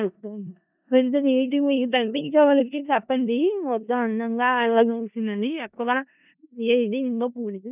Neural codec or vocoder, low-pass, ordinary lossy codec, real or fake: codec, 16 kHz in and 24 kHz out, 0.4 kbps, LongCat-Audio-Codec, four codebook decoder; 3.6 kHz; none; fake